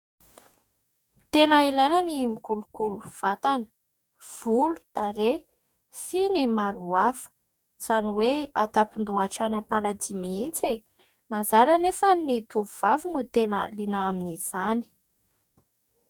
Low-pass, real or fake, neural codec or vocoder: 19.8 kHz; fake; codec, 44.1 kHz, 2.6 kbps, DAC